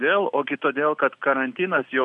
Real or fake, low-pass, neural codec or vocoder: real; 10.8 kHz; none